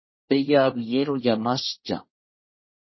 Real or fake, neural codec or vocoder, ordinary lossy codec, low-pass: fake; codec, 24 kHz, 1 kbps, SNAC; MP3, 24 kbps; 7.2 kHz